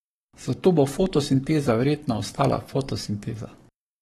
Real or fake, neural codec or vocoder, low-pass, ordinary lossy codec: fake; codec, 44.1 kHz, 7.8 kbps, Pupu-Codec; 19.8 kHz; AAC, 32 kbps